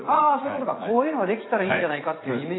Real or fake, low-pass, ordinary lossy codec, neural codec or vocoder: fake; 7.2 kHz; AAC, 16 kbps; vocoder, 44.1 kHz, 80 mel bands, Vocos